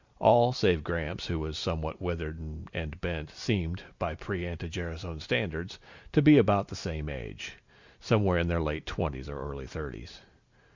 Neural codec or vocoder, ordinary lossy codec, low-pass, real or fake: none; Opus, 64 kbps; 7.2 kHz; real